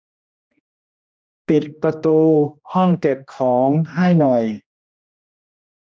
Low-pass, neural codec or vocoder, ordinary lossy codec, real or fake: none; codec, 16 kHz, 1 kbps, X-Codec, HuBERT features, trained on general audio; none; fake